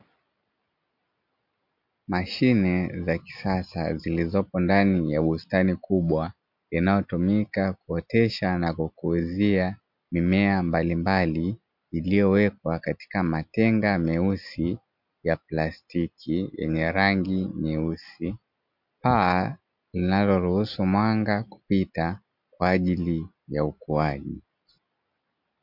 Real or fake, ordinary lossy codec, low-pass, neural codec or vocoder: real; MP3, 48 kbps; 5.4 kHz; none